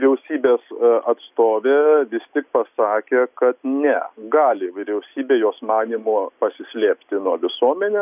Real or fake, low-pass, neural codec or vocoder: real; 3.6 kHz; none